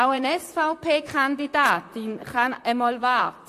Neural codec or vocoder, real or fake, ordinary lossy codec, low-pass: vocoder, 44.1 kHz, 128 mel bands, Pupu-Vocoder; fake; AAC, 64 kbps; 14.4 kHz